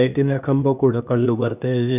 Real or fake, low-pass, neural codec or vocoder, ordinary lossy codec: fake; 3.6 kHz; codec, 16 kHz, 0.8 kbps, ZipCodec; none